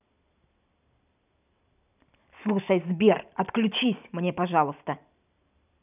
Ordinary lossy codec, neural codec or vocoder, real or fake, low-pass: none; none; real; 3.6 kHz